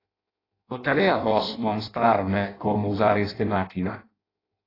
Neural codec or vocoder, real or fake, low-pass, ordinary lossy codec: codec, 16 kHz in and 24 kHz out, 0.6 kbps, FireRedTTS-2 codec; fake; 5.4 kHz; AAC, 24 kbps